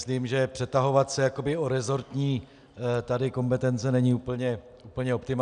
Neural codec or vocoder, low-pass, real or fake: none; 9.9 kHz; real